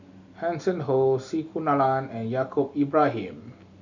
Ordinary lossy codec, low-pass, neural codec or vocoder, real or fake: none; 7.2 kHz; none; real